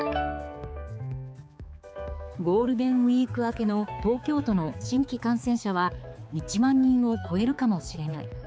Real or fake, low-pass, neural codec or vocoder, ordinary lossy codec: fake; none; codec, 16 kHz, 4 kbps, X-Codec, HuBERT features, trained on balanced general audio; none